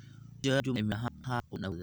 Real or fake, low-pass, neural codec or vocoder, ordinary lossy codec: real; none; none; none